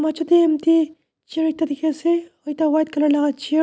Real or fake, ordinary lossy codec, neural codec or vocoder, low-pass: real; none; none; none